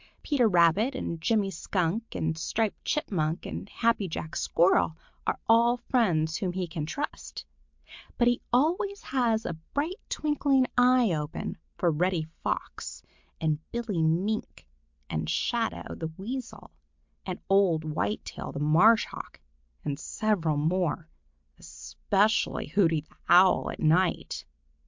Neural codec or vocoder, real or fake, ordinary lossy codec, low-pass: none; real; MP3, 64 kbps; 7.2 kHz